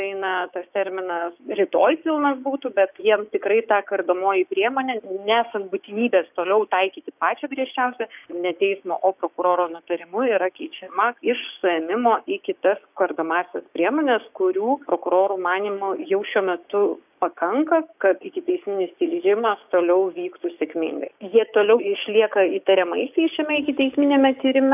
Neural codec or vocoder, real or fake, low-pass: codec, 16 kHz, 6 kbps, DAC; fake; 3.6 kHz